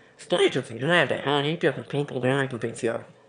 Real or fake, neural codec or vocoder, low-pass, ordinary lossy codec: fake; autoencoder, 22.05 kHz, a latent of 192 numbers a frame, VITS, trained on one speaker; 9.9 kHz; none